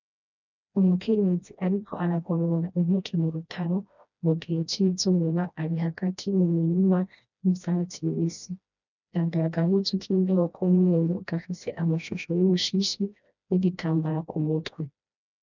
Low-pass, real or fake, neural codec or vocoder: 7.2 kHz; fake; codec, 16 kHz, 1 kbps, FreqCodec, smaller model